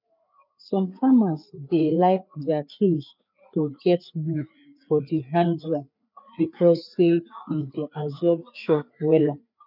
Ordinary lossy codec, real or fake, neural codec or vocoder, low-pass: none; fake; codec, 16 kHz, 4 kbps, FreqCodec, larger model; 5.4 kHz